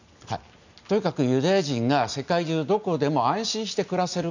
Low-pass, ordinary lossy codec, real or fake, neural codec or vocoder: 7.2 kHz; none; real; none